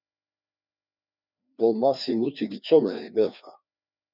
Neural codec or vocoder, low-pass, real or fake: codec, 16 kHz, 2 kbps, FreqCodec, larger model; 5.4 kHz; fake